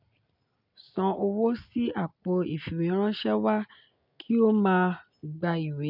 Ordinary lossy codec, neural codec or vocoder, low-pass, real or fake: none; none; 5.4 kHz; real